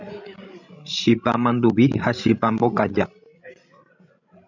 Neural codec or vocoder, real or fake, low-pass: codec, 16 kHz, 16 kbps, FreqCodec, larger model; fake; 7.2 kHz